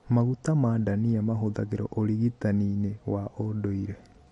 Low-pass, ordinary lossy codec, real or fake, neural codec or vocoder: 19.8 kHz; MP3, 48 kbps; real; none